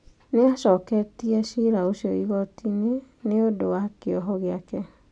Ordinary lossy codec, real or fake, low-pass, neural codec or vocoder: none; real; 9.9 kHz; none